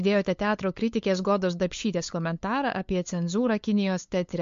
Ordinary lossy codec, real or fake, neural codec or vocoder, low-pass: MP3, 48 kbps; fake; codec, 16 kHz, 8 kbps, FunCodec, trained on LibriTTS, 25 frames a second; 7.2 kHz